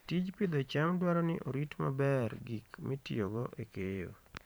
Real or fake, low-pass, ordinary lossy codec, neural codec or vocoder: fake; none; none; vocoder, 44.1 kHz, 128 mel bands every 512 samples, BigVGAN v2